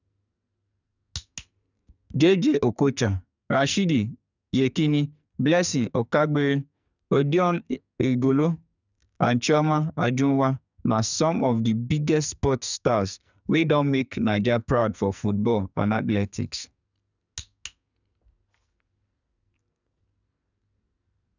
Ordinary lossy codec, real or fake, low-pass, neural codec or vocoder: none; fake; 7.2 kHz; codec, 44.1 kHz, 2.6 kbps, SNAC